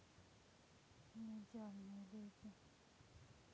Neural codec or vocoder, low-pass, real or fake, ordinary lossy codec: none; none; real; none